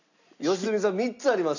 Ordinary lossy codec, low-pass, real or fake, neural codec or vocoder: none; 7.2 kHz; real; none